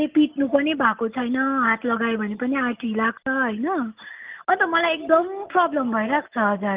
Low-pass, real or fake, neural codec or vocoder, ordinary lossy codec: 3.6 kHz; real; none; Opus, 32 kbps